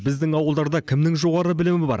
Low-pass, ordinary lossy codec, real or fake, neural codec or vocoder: none; none; real; none